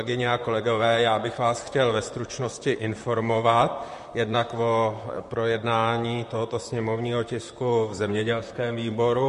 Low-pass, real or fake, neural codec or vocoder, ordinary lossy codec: 14.4 kHz; fake; vocoder, 44.1 kHz, 128 mel bands, Pupu-Vocoder; MP3, 48 kbps